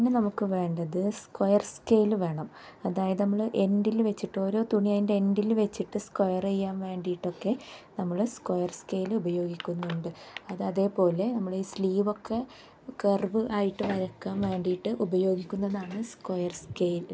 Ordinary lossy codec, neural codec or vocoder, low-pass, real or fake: none; none; none; real